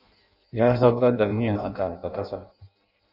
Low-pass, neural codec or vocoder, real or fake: 5.4 kHz; codec, 16 kHz in and 24 kHz out, 0.6 kbps, FireRedTTS-2 codec; fake